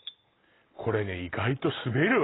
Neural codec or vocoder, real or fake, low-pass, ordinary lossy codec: none; real; 7.2 kHz; AAC, 16 kbps